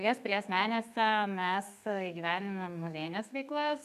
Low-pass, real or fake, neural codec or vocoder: 14.4 kHz; fake; codec, 32 kHz, 1.9 kbps, SNAC